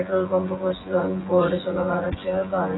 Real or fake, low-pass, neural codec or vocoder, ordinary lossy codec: fake; 7.2 kHz; vocoder, 24 kHz, 100 mel bands, Vocos; AAC, 16 kbps